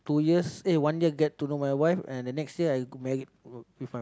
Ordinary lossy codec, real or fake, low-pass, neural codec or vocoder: none; real; none; none